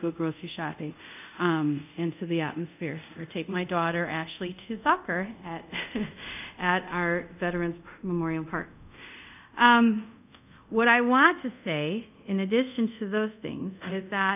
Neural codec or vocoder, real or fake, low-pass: codec, 24 kHz, 0.5 kbps, DualCodec; fake; 3.6 kHz